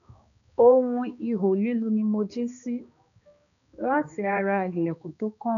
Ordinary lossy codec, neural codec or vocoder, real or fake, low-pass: none; codec, 16 kHz, 2 kbps, X-Codec, HuBERT features, trained on balanced general audio; fake; 7.2 kHz